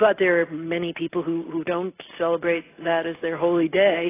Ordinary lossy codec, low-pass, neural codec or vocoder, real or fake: AAC, 16 kbps; 3.6 kHz; none; real